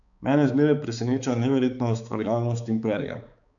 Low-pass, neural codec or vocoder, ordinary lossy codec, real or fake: 7.2 kHz; codec, 16 kHz, 4 kbps, X-Codec, HuBERT features, trained on balanced general audio; none; fake